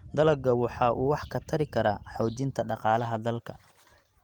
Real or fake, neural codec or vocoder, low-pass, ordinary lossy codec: fake; vocoder, 44.1 kHz, 128 mel bands every 512 samples, BigVGAN v2; 19.8 kHz; Opus, 24 kbps